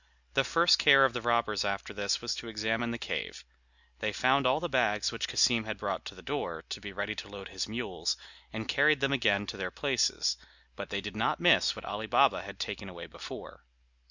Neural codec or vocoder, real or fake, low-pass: none; real; 7.2 kHz